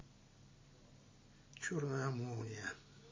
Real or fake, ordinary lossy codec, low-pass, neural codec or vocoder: real; MP3, 32 kbps; 7.2 kHz; none